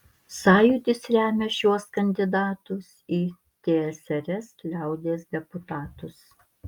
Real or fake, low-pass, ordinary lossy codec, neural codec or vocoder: real; 19.8 kHz; Opus, 32 kbps; none